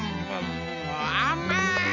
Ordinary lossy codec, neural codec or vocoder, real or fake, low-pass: none; none; real; 7.2 kHz